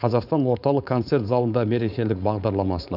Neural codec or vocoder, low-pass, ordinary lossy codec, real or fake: codec, 16 kHz, 4.8 kbps, FACodec; 5.4 kHz; none; fake